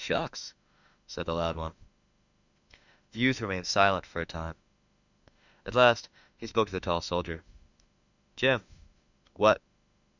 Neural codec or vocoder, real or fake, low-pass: autoencoder, 48 kHz, 32 numbers a frame, DAC-VAE, trained on Japanese speech; fake; 7.2 kHz